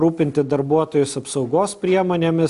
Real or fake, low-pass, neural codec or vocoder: real; 10.8 kHz; none